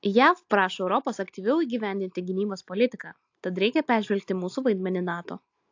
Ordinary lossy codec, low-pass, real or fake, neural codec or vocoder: AAC, 48 kbps; 7.2 kHz; real; none